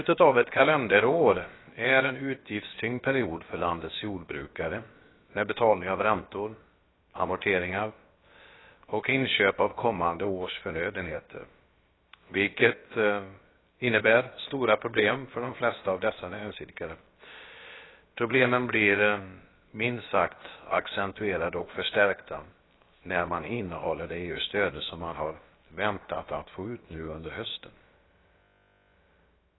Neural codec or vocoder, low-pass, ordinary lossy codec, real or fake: codec, 16 kHz, about 1 kbps, DyCAST, with the encoder's durations; 7.2 kHz; AAC, 16 kbps; fake